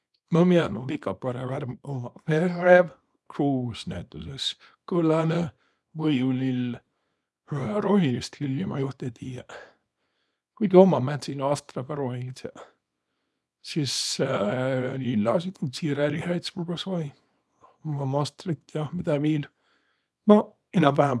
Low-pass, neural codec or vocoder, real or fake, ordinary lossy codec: none; codec, 24 kHz, 0.9 kbps, WavTokenizer, small release; fake; none